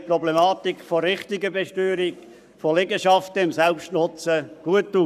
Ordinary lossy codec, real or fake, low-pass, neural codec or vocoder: none; fake; 14.4 kHz; codec, 44.1 kHz, 7.8 kbps, Pupu-Codec